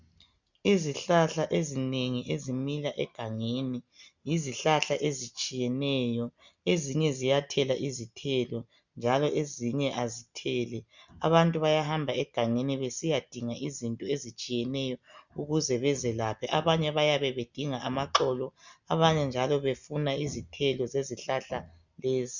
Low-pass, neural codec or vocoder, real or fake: 7.2 kHz; none; real